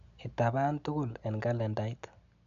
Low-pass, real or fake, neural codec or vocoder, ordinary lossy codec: 7.2 kHz; real; none; none